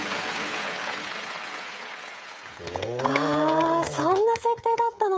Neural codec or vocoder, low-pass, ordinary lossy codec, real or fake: codec, 16 kHz, 16 kbps, FreqCodec, smaller model; none; none; fake